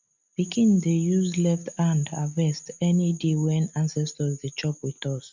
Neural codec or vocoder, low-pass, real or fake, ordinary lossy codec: none; 7.2 kHz; real; MP3, 64 kbps